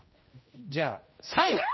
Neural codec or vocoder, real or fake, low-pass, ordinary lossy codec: codec, 16 kHz, 1 kbps, X-Codec, HuBERT features, trained on general audio; fake; 7.2 kHz; MP3, 24 kbps